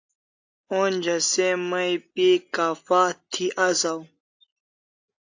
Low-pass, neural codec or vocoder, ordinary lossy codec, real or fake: 7.2 kHz; none; AAC, 48 kbps; real